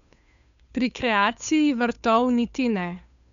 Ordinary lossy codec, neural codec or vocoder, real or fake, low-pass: none; codec, 16 kHz, 2 kbps, FunCodec, trained on Chinese and English, 25 frames a second; fake; 7.2 kHz